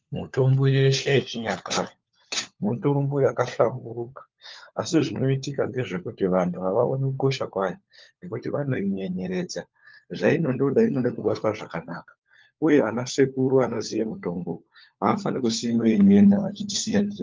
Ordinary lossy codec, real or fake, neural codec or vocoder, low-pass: Opus, 24 kbps; fake; codec, 16 kHz, 4 kbps, FunCodec, trained on LibriTTS, 50 frames a second; 7.2 kHz